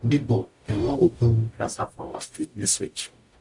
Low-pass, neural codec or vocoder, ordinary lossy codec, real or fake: 10.8 kHz; codec, 44.1 kHz, 0.9 kbps, DAC; AAC, 64 kbps; fake